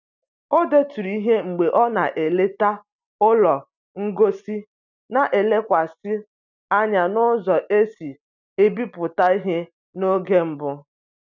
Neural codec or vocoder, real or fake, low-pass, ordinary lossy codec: none; real; 7.2 kHz; none